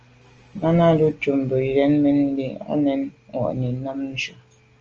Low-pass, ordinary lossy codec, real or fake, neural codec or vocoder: 7.2 kHz; Opus, 24 kbps; real; none